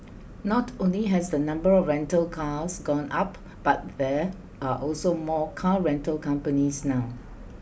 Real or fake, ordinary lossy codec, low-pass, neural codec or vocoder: real; none; none; none